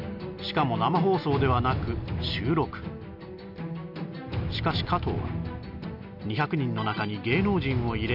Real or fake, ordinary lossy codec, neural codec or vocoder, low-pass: real; none; none; 5.4 kHz